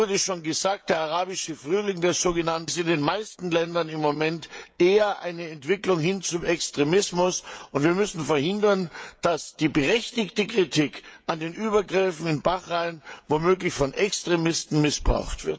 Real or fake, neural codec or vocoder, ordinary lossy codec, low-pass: fake; codec, 16 kHz, 16 kbps, FreqCodec, smaller model; none; none